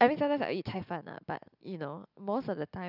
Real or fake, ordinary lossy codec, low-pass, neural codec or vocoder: real; none; 5.4 kHz; none